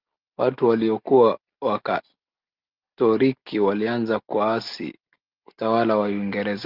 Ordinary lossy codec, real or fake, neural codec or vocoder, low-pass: Opus, 24 kbps; real; none; 5.4 kHz